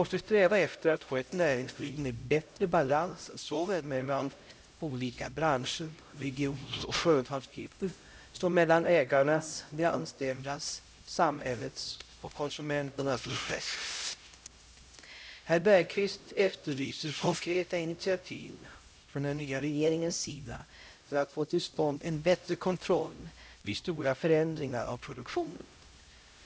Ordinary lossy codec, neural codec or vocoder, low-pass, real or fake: none; codec, 16 kHz, 0.5 kbps, X-Codec, HuBERT features, trained on LibriSpeech; none; fake